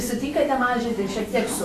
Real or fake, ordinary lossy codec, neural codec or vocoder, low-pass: fake; AAC, 48 kbps; autoencoder, 48 kHz, 128 numbers a frame, DAC-VAE, trained on Japanese speech; 14.4 kHz